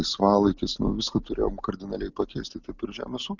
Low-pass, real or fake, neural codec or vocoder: 7.2 kHz; real; none